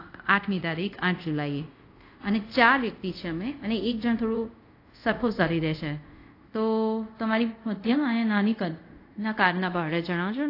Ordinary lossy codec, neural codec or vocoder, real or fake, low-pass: AAC, 32 kbps; codec, 24 kHz, 0.5 kbps, DualCodec; fake; 5.4 kHz